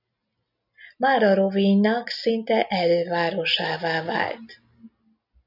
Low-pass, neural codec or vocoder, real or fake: 5.4 kHz; none; real